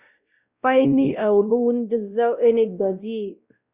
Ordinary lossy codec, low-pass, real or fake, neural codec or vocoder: Opus, 64 kbps; 3.6 kHz; fake; codec, 16 kHz, 0.5 kbps, X-Codec, WavLM features, trained on Multilingual LibriSpeech